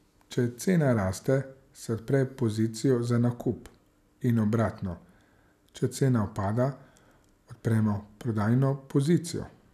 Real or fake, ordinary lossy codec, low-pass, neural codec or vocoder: real; none; 14.4 kHz; none